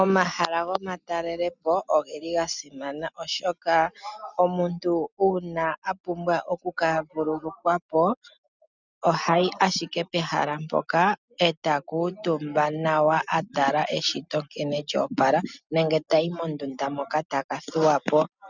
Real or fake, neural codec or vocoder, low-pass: real; none; 7.2 kHz